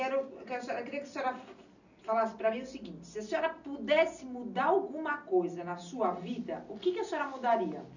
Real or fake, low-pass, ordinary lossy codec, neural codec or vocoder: real; 7.2 kHz; none; none